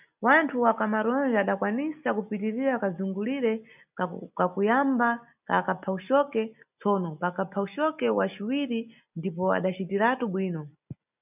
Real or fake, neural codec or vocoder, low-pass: real; none; 3.6 kHz